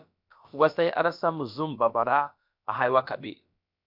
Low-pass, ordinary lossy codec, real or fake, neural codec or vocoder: 5.4 kHz; MP3, 48 kbps; fake; codec, 16 kHz, about 1 kbps, DyCAST, with the encoder's durations